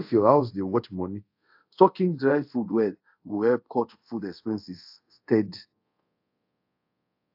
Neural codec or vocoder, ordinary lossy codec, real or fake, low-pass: codec, 24 kHz, 0.5 kbps, DualCodec; AAC, 48 kbps; fake; 5.4 kHz